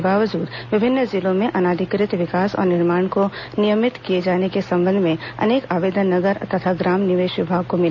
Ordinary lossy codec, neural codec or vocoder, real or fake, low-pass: none; none; real; 7.2 kHz